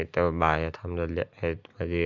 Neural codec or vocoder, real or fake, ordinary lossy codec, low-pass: none; real; none; 7.2 kHz